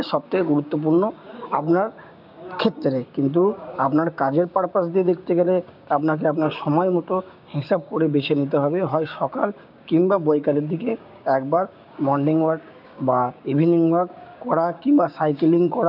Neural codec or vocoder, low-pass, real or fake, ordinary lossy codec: codec, 16 kHz, 6 kbps, DAC; 5.4 kHz; fake; none